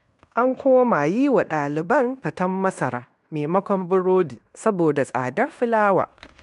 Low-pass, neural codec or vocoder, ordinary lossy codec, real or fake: 10.8 kHz; codec, 16 kHz in and 24 kHz out, 0.9 kbps, LongCat-Audio-Codec, fine tuned four codebook decoder; none; fake